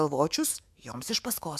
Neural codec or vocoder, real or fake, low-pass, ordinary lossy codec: autoencoder, 48 kHz, 128 numbers a frame, DAC-VAE, trained on Japanese speech; fake; 14.4 kHz; MP3, 96 kbps